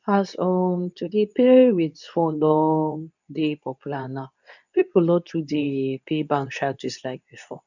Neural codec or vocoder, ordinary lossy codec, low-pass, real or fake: codec, 24 kHz, 0.9 kbps, WavTokenizer, medium speech release version 2; none; 7.2 kHz; fake